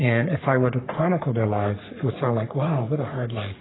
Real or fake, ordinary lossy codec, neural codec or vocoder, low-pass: fake; AAC, 16 kbps; codec, 44.1 kHz, 3.4 kbps, Pupu-Codec; 7.2 kHz